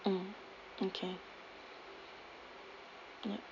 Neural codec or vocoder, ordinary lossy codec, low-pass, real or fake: none; none; 7.2 kHz; real